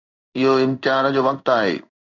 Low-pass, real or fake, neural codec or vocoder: 7.2 kHz; fake; codec, 16 kHz in and 24 kHz out, 1 kbps, XY-Tokenizer